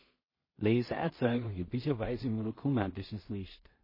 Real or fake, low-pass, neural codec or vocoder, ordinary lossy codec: fake; 5.4 kHz; codec, 16 kHz in and 24 kHz out, 0.4 kbps, LongCat-Audio-Codec, two codebook decoder; MP3, 24 kbps